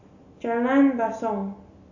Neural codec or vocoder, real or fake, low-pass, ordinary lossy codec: none; real; 7.2 kHz; AAC, 48 kbps